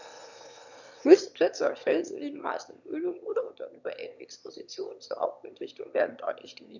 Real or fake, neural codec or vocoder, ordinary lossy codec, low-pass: fake; autoencoder, 22.05 kHz, a latent of 192 numbers a frame, VITS, trained on one speaker; none; 7.2 kHz